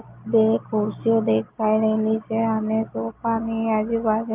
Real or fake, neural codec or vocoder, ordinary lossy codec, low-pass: real; none; Opus, 32 kbps; 3.6 kHz